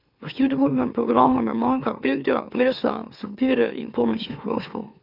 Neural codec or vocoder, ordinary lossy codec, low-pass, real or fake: autoencoder, 44.1 kHz, a latent of 192 numbers a frame, MeloTTS; none; 5.4 kHz; fake